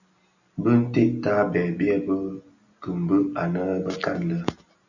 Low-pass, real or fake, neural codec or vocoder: 7.2 kHz; real; none